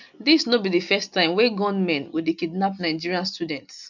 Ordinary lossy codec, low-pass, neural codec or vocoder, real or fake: none; 7.2 kHz; none; real